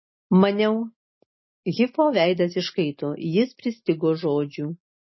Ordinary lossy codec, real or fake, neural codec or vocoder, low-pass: MP3, 24 kbps; real; none; 7.2 kHz